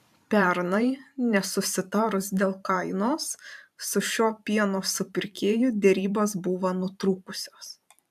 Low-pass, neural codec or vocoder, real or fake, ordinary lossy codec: 14.4 kHz; vocoder, 44.1 kHz, 128 mel bands every 512 samples, BigVGAN v2; fake; AAC, 96 kbps